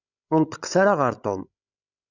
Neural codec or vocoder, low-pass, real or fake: codec, 16 kHz, 16 kbps, FreqCodec, larger model; 7.2 kHz; fake